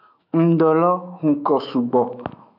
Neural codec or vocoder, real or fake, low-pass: autoencoder, 48 kHz, 128 numbers a frame, DAC-VAE, trained on Japanese speech; fake; 5.4 kHz